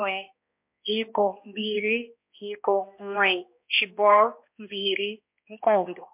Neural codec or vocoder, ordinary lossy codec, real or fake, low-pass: codec, 16 kHz, 1 kbps, X-Codec, HuBERT features, trained on balanced general audio; MP3, 32 kbps; fake; 3.6 kHz